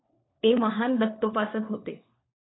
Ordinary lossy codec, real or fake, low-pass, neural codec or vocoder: AAC, 16 kbps; fake; 7.2 kHz; codec, 16 kHz, 4 kbps, FunCodec, trained on LibriTTS, 50 frames a second